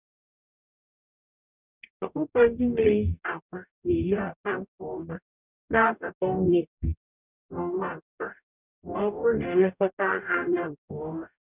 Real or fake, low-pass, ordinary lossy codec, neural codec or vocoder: fake; 3.6 kHz; none; codec, 44.1 kHz, 0.9 kbps, DAC